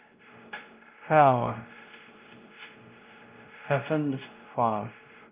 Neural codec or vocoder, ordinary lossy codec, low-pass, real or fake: codec, 16 kHz, 0.5 kbps, X-Codec, WavLM features, trained on Multilingual LibriSpeech; Opus, 24 kbps; 3.6 kHz; fake